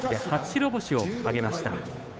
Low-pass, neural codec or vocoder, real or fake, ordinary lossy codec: none; codec, 16 kHz, 8 kbps, FunCodec, trained on Chinese and English, 25 frames a second; fake; none